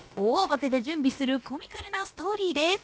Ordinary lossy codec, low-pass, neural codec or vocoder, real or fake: none; none; codec, 16 kHz, about 1 kbps, DyCAST, with the encoder's durations; fake